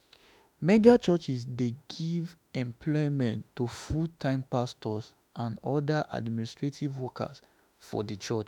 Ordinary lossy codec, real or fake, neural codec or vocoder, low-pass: none; fake; autoencoder, 48 kHz, 32 numbers a frame, DAC-VAE, trained on Japanese speech; 19.8 kHz